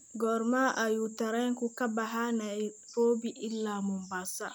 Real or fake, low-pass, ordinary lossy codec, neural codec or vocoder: real; none; none; none